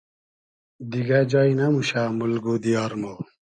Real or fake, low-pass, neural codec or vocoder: real; 9.9 kHz; none